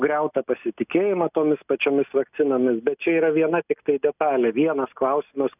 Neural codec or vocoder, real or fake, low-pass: none; real; 3.6 kHz